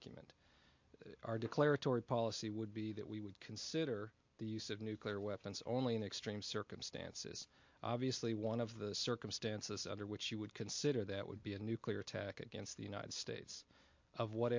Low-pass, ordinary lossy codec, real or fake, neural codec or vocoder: 7.2 kHz; MP3, 48 kbps; real; none